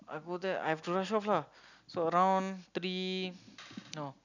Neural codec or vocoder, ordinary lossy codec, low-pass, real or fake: none; none; 7.2 kHz; real